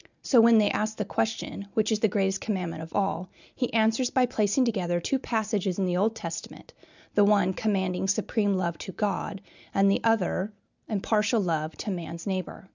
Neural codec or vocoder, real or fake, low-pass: none; real; 7.2 kHz